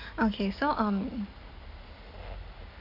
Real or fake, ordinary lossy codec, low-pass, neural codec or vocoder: real; none; 5.4 kHz; none